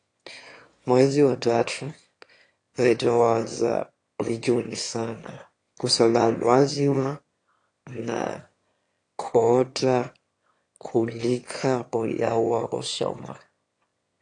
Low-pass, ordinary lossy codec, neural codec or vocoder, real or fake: 9.9 kHz; AAC, 48 kbps; autoencoder, 22.05 kHz, a latent of 192 numbers a frame, VITS, trained on one speaker; fake